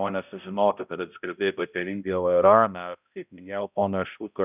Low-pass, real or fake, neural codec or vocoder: 3.6 kHz; fake; codec, 16 kHz, 0.5 kbps, X-Codec, HuBERT features, trained on balanced general audio